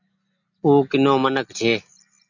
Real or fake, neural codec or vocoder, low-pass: real; none; 7.2 kHz